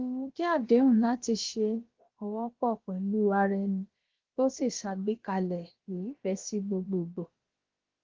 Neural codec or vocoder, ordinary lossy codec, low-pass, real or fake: codec, 16 kHz, about 1 kbps, DyCAST, with the encoder's durations; Opus, 16 kbps; 7.2 kHz; fake